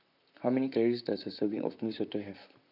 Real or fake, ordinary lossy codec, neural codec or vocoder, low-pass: fake; none; vocoder, 44.1 kHz, 128 mel bands, Pupu-Vocoder; 5.4 kHz